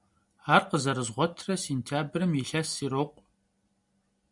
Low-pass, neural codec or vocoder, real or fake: 10.8 kHz; none; real